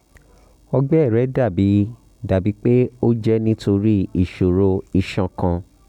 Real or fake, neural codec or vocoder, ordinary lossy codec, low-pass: real; none; none; 19.8 kHz